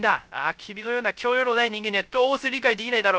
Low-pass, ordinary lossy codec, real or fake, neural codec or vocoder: none; none; fake; codec, 16 kHz, 0.3 kbps, FocalCodec